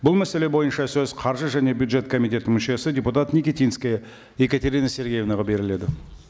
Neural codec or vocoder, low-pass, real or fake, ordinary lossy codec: none; none; real; none